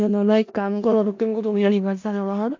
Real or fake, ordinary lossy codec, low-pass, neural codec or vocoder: fake; AAC, 48 kbps; 7.2 kHz; codec, 16 kHz in and 24 kHz out, 0.4 kbps, LongCat-Audio-Codec, four codebook decoder